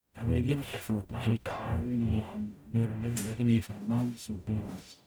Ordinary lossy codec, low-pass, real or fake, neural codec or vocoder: none; none; fake; codec, 44.1 kHz, 0.9 kbps, DAC